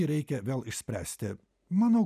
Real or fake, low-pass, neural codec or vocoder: real; 14.4 kHz; none